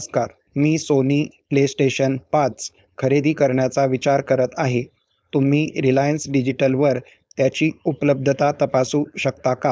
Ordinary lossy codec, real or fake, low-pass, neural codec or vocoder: none; fake; none; codec, 16 kHz, 4.8 kbps, FACodec